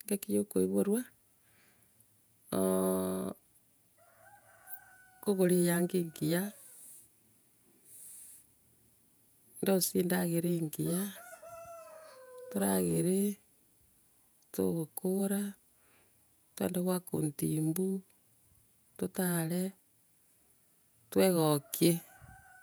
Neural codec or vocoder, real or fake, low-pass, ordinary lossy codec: vocoder, 48 kHz, 128 mel bands, Vocos; fake; none; none